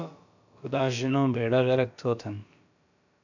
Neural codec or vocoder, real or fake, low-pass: codec, 16 kHz, about 1 kbps, DyCAST, with the encoder's durations; fake; 7.2 kHz